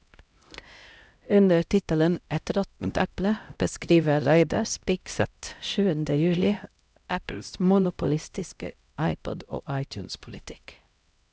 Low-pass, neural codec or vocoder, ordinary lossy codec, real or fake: none; codec, 16 kHz, 0.5 kbps, X-Codec, HuBERT features, trained on LibriSpeech; none; fake